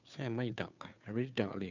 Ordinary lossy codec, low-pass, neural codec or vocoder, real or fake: none; 7.2 kHz; codec, 16 kHz, 2 kbps, FunCodec, trained on Chinese and English, 25 frames a second; fake